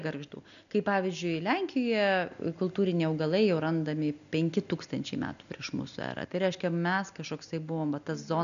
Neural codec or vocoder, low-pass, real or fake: none; 7.2 kHz; real